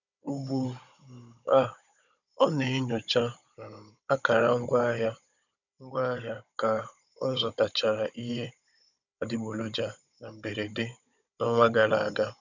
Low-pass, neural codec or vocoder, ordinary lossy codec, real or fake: 7.2 kHz; codec, 16 kHz, 16 kbps, FunCodec, trained on Chinese and English, 50 frames a second; none; fake